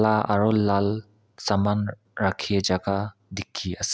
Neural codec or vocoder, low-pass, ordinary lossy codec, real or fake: none; none; none; real